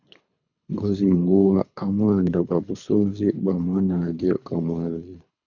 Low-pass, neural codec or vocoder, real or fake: 7.2 kHz; codec, 24 kHz, 3 kbps, HILCodec; fake